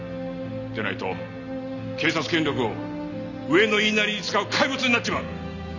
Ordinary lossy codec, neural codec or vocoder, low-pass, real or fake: none; none; 7.2 kHz; real